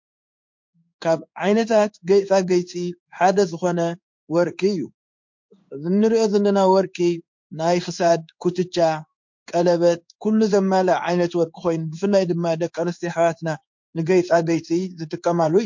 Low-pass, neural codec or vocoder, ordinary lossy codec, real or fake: 7.2 kHz; codec, 16 kHz in and 24 kHz out, 1 kbps, XY-Tokenizer; MP3, 48 kbps; fake